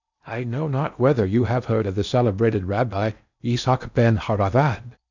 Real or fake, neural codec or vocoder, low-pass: fake; codec, 16 kHz in and 24 kHz out, 0.8 kbps, FocalCodec, streaming, 65536 codes; 7.2 kHz